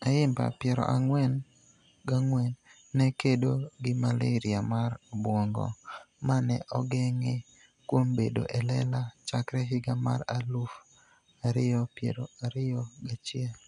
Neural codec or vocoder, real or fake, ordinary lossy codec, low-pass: none; real; none; 10.8 kHz